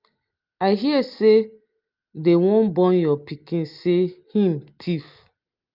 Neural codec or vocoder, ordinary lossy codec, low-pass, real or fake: none; Opus, 24 kbps; 5.4 kHz; real